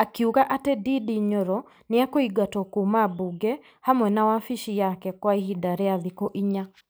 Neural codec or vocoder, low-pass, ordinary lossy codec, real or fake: none; none; none; real